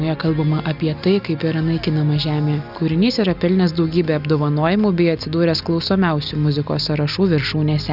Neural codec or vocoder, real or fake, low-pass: none; real; 5.4 kHz